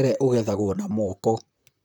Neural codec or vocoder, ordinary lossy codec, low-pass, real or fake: vocoder, 44.1 kHz, 128 mel bands, Pupu-Vocoder; none; none; fake